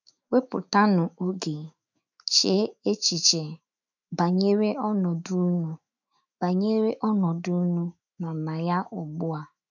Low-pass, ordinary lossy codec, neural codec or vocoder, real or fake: 7.2 kHz; none; autoencoder, 48 kHz, 128 numbers a frame, DAC-VAE, trained on Japanese speech; fake